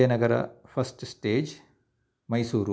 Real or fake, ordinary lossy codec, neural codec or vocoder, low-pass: real; none; none; none